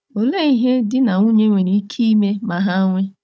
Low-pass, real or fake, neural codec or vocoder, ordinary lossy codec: none; fake; codec, 16 kHz, 16 kbps, FunCodec, trained on Chinese and English, 50 frames a second; none